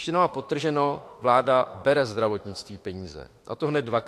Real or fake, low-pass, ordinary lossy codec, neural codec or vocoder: fake; 14.4 kHz; AAC, 64 kbps; autoencoder, 48 kHz, 32 numbers a frame, DAC-VAE, trained on Japanese speech